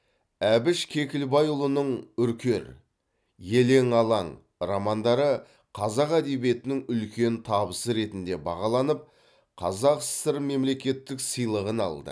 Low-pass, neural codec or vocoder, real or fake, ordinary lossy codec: none; none; real; none